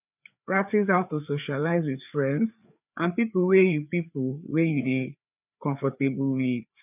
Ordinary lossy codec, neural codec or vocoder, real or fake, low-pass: none; codec, 16 kHz, 4 kbps, FreqCodec, larger model; fake; 3.6 kHz